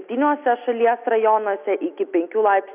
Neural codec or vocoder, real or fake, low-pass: none; real; 3.6 kHz